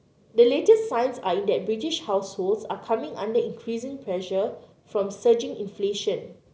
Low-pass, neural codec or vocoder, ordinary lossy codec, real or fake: none; none; none; real